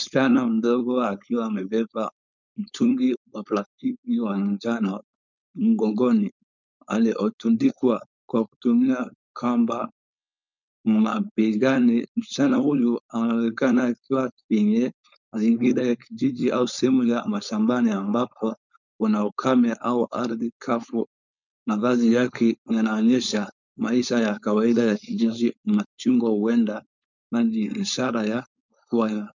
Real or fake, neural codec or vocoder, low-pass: fake; codec, 16 kHz, 4.8 kbps, FACodec; 7.2 kHz